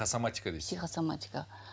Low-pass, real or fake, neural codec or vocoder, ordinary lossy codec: none; real; none; none